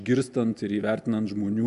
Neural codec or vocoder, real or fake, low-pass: none; real; 10.8 kHz